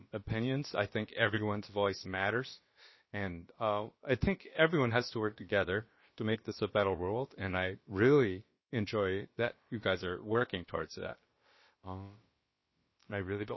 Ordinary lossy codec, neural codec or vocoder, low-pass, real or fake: MP3, 24 kbps; codec, 16 kHz, about 1 kbps, DyCAST, with the encoder's durations; 7.2 kHz; fake